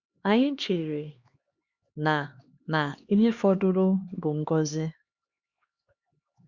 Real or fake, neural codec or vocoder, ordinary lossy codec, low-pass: fake; codec, 16 kHz, 2 kbps, X-Codec, HuBERT features, trained on LibriSpeech; Opus, 64 kbps; 7.2 kHz